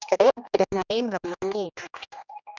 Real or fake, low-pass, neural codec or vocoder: fake; 7.2 kHz; codec, 16 kHz, 1 kbps, X-Codec, HuBERT features, trained on general audio